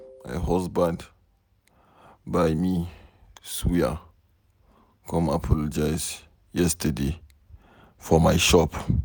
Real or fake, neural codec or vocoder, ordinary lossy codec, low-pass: real; none; none; none